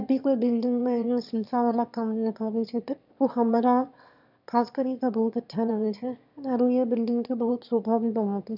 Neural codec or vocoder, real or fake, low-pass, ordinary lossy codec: autoencoder, 22.05 kHz, a latent of 192 numbers a frame, VITS, trained on one speaker; fake; 5.4 kHz; none